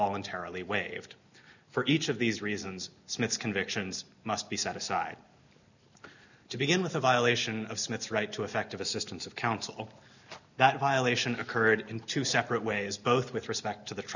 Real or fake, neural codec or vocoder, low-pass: real; none; 7.2 kHz